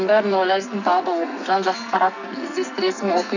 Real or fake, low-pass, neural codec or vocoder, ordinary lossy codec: fake; 7.2 kHz; codec, 44.1 kHz, 2.6 kbps, SNAC; none